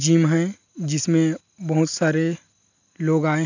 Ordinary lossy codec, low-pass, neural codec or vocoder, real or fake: none; 7.2 kHz; none; real